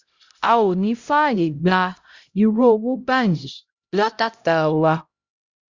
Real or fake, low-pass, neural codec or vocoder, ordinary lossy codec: fake; 7.2 kHz; codec, 16 kHz, 0.5 kbps, X-Codec, HuBERT features, trained on LibriSpeech; Opus, 64 kbps